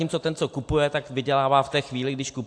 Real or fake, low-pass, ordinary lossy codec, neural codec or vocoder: real; 9.9 kHz; AAC, 64 kbps; none